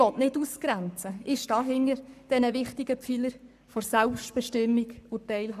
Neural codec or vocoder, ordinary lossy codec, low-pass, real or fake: codec, 44.1 kHz, 7.8 kbps, Pupu-Codec; none; 14.4 kHz; fake